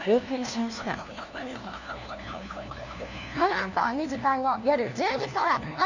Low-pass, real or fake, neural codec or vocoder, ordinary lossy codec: 7.2 kHz; fake; codec, 16 kHz, 1 kbps, FunCodec, trained on LibriTTS, 50 frames a second; none